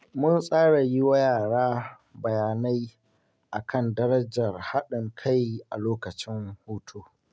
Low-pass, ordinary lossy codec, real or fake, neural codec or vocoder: none; none; real; none